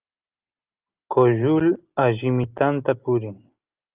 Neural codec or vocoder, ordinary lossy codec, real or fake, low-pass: none; Opus, 32 kbps; real; 3.6 kHz